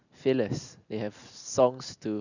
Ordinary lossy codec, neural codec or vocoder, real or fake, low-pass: none; none; real; 7.2 kHz